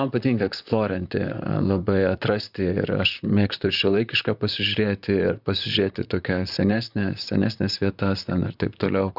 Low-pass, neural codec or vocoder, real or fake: 5.4 kHz; vocoder, 44.1 kHz, 128 mel bands, Pupu-Vocoder; fake